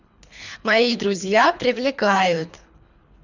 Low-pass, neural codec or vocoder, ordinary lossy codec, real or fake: 7.2 kHz; codec, 24 kHz, 3 kbps, HILCodec; none; fake